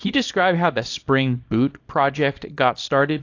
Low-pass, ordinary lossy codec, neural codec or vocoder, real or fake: 7.2 kHz; AAC, 48 kbps; codec, 24 kHz, 0.9 kbps, WavTokenizer, small release; fake